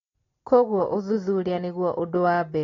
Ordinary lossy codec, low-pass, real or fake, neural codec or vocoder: AAC, 32 kbps; 7.2 kHz; real; none